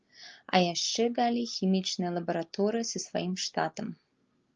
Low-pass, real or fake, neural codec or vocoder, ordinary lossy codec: 7.2 kHz; real; none; Opus, 24 kbps